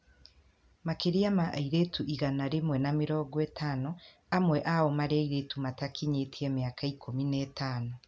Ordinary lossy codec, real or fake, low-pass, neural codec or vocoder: none; real; none; none